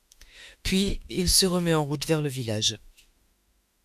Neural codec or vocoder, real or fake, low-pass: autoencoder, 48 kHz, 32 numbers a frame, DAC-VAE, trained on Japanese speech; fake; 14.4 kHz